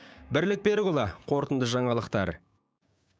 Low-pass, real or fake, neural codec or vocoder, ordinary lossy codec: none; fake; codec, 16 kHz, 6 kbps, DAC; none